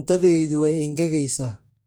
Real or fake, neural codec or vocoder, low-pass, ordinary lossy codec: fake; codec, 44.1 kHz, 2.6 kbps, DAC; none; none